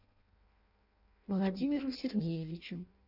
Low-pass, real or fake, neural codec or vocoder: 5.4 kHz; fake; codec, 16 kHz in and 24 kHz out, 0.6 kbps, FireRedTTS-2 codec